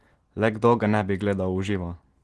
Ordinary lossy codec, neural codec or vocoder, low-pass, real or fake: Opus, 16 kbps; none; 10.8 kHz; real